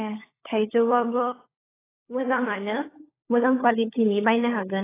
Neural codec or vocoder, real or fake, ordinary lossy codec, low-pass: codec, 16 kHz, 16 kbps, FunCodec, trained on LibriTTS, 50 frames a second; fake; AAC, 16 kbps; 3.6 kHz